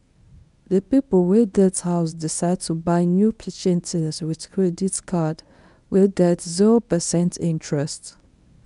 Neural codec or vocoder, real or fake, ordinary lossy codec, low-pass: codec, 24 kHz, 0.9 kbps, WavTokenizer, medium speech release version 1; fake; MP3, 96 kbps; 10.8 kHz